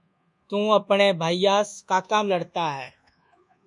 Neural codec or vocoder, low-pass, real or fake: codec, 24 kHz, 1.2 kbps, DualCodec; 10.8 kHz; fake